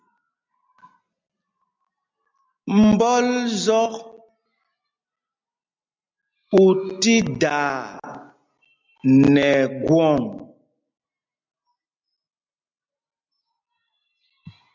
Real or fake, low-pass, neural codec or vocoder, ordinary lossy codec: real; 7.2 kHz; none; MP3, 64 kbps